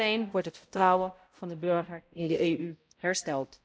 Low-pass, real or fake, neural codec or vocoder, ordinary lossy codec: none; fake; codec, 16 kHz, 0.5 kbps, X-Codec, HuBERT features, trained on balanced general audio; none